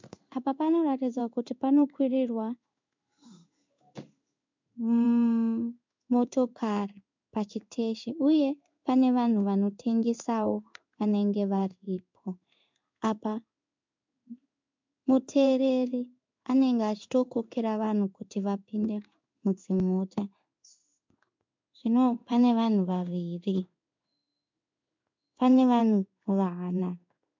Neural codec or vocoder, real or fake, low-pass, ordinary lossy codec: codec, 16 kHz in and 24 kHz out, 1 kbps, XY-Tokenizer; fake; 7.2 kHz; MP3, 64 kbps